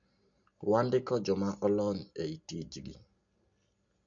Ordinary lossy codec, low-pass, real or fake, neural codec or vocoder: none; 7.2 kHz; real; none